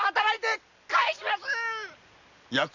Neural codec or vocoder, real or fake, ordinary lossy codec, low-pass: none; real; none; 7.2 kHz